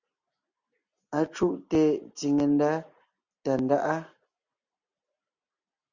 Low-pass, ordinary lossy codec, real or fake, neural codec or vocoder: 7.2 kHz; Opus, 64 kbps; real; none